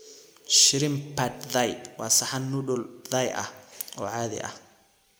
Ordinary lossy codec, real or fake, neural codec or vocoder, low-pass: none; real; none; none